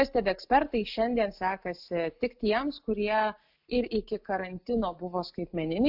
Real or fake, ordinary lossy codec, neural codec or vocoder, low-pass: real; AAC, 48 kbps; none; 5.4 kHz